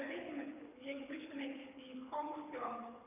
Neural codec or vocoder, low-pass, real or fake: codec, 24 kHz, 6 kbps, HILCodec; 3.6 kHz; fake